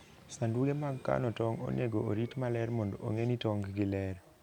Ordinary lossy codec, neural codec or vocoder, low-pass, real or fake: none; none; 19.8 kHz; real